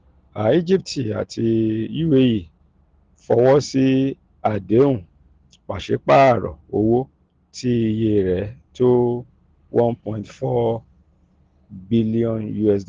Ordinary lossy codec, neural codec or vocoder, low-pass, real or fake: Opus, 16 kbps; none; 7.2 kHz; real